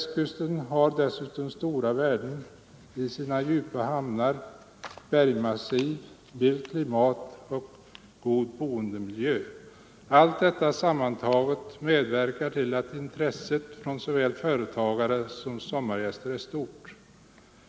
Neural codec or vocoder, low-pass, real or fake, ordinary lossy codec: none; none; real; none